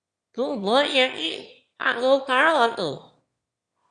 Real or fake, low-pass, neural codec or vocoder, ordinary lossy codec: fake; 9.9 kHz; autoencoder, 22.05 kHz, a latent of 192 numbers a frame, VITS, trained on one speaker; Opus, 64 kbps